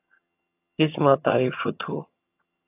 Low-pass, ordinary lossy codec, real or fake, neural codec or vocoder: 3.6 kHz; AAC, 32 kbps; fake; vocoder, 22.05 kHz, 80 mel bands, HiFi-GAN